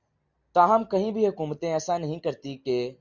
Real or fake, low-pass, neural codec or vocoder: real; 7.2 kHz; none